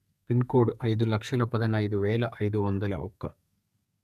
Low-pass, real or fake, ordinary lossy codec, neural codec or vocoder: 14.4 kHz; fake; none; codec, 32 kHz, 1.9 kbps, SNAC